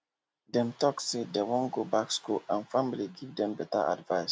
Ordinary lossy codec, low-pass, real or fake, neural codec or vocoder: none; none; real; none